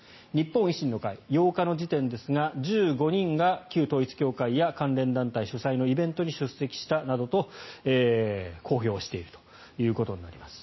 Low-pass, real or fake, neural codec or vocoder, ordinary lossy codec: 7.2 kHz; real; none; MP3, 24 kbps